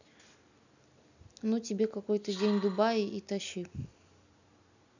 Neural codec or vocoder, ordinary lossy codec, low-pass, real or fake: none; none; 7.2 kHz; real